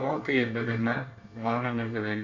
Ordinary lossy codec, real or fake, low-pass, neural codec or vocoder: Opus, 64 kbps; fake; 7.2 kHz; codec, 24 kHz, 1 kbps, SNAC